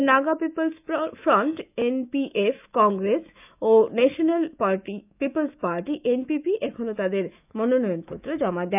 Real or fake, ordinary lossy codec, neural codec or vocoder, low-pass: fake; none; autoencoder, 48 kHz, 128 numbers a frame, DAC-VAE, trained on Japanese speech; 3.6 kHz